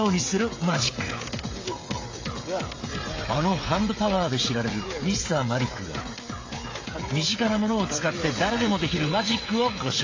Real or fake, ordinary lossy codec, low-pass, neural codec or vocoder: fake; AAC, 32 kbps; 7.2 kHz; codec, 16 kHz, 16 kbps, FreqCodec, smaller model